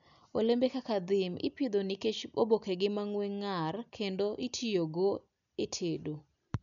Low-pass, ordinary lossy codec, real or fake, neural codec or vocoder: 7.2 kHz; none; real; none